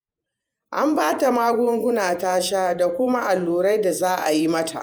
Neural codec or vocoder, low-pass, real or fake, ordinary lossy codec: vocoder, 48 kHz, 128 mel bands, Vocos; none; fake; none